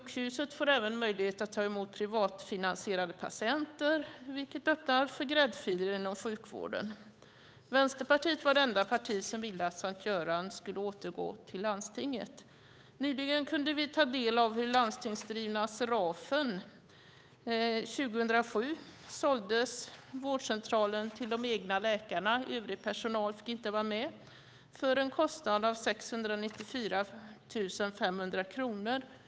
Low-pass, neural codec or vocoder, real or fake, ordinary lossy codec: none; codec, 16 kHz, 8 kbps, FunCodec, trained on Chinese and English, 25 frames a second; fake; none